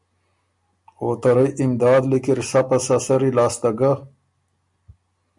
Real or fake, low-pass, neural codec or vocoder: real; 10.8 kHz; none